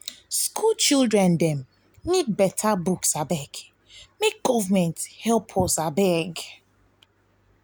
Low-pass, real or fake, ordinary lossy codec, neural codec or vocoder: none; real; none; none